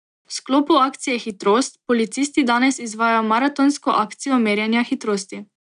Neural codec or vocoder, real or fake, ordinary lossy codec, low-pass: none; real; none; 9.9 kHz